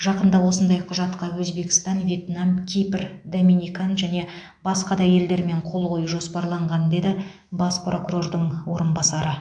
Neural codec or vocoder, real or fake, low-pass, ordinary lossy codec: autoencoder, 48 kHz, 128 numbers a frame, DAC-VAE, trained on Japanese speech; fake; 9.9 kHz; AAC, 64 kbps